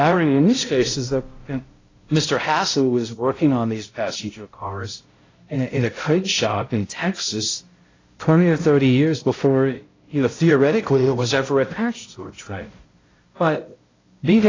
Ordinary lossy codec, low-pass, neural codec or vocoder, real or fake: AAC, 32 kbps; 7.2 kHz; codec, 16 kHz, 0.5 kbps, X-Codec, HuBERT features, trained on balanced general audio; fake